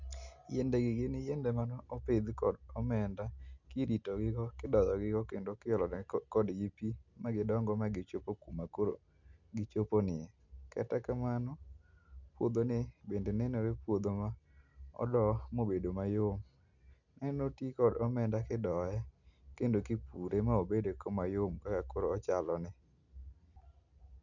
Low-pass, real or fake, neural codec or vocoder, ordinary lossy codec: 7.2 kHz; real; none; none